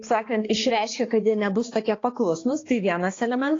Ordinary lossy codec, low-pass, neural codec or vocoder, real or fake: AAC, 32 kbps; 7.2 kHz; codec, 16 kHz, 2 kbps, X-Codec, HuBERT features, trained on balanced general audio; fake